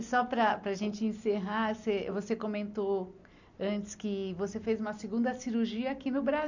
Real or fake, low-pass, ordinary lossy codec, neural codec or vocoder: real; 7.2 kHz; none; none